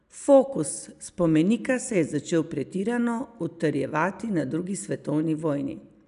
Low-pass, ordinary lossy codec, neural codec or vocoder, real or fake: 10.8 kHz; none; none; real